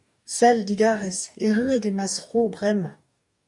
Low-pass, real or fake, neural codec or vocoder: 10.8 kHz; fake; codec, 44.1 kHz, 2.6 kbps, DAC